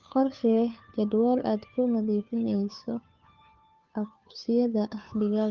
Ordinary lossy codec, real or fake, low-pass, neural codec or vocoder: Opus, 32 kbps; fake; 7.2 kHz; codec, 16 kHz, 2 kbps, FunCodec, trained on Chinese and English, 25 frames a second